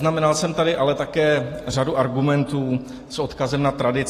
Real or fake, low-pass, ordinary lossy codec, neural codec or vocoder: real; 14.4 kHz; AAC, 48 kbps; none